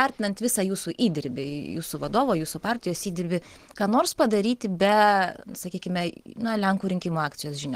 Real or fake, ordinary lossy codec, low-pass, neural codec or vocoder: real; Opus, 16 kbps; 14.4 kHz; none